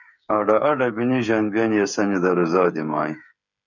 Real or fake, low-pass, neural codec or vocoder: fake; 7.2 kHz; codec, 16 kHz, 8 kbps, FreqCodec, smaller model